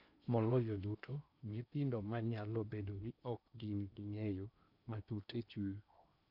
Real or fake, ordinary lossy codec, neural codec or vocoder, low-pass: fake; none; codec, 16 kHz in and 24 kHz out, 0.8 kbps, FocalCodec, streaming, 65536 codes; 5.4 kHz